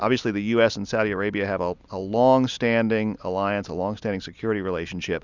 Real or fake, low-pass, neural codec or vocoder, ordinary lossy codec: real; 7.2 kHz; none; Opus, 64 kbps